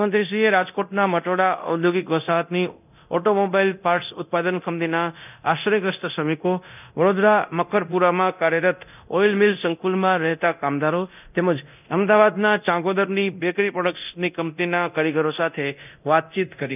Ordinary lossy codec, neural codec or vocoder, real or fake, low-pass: none; codec, 24 kHz, 0.9 kbps, DualCodec; fake; 3.6 kHz